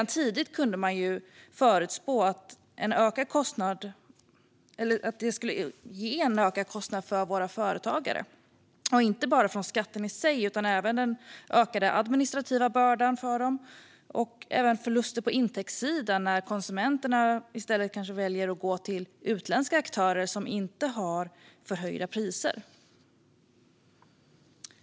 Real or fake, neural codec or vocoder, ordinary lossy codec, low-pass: real; none; none; none